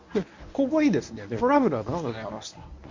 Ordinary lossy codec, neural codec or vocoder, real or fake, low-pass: MP3, 48 kbps; codec, 24 kHz, 0.9 kbps, WavTokenizer, medium speech release version 1; fake; 7.2 kHz